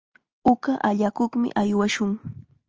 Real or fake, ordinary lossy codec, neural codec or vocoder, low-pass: real; Opus, 24 kbps; none; 7.2 kHz